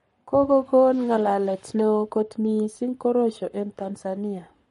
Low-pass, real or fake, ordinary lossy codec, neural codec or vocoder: 19.8 kHz; fake; MP3, 48 kbps; codec, 44.1 kHz, 7.8 kbps, Pupu-Codec